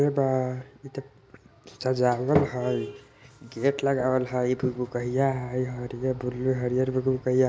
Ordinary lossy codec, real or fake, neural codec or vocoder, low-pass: none; real; none; none